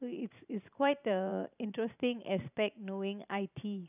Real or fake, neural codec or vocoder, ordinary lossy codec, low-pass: fake; vocoder, 44.1 kHz, 128 mel bands every 512 samples, BigVGAN v2; none; 3.6 kHz